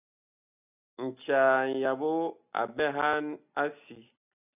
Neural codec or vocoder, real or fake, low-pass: none; real; 3.6 kHz